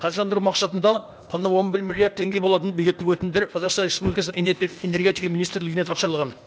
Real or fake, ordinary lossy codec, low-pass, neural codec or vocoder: fake; none; none; codec, 16 kHz, 0.8 kbps, ZipCodec